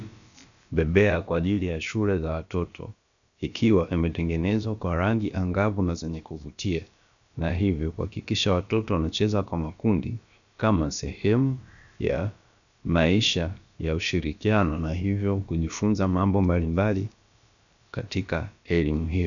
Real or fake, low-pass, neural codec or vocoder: fake; 7.2 kHz; codec, 16 kHz, about 1 kbps, DyCAST, with the encoder's durations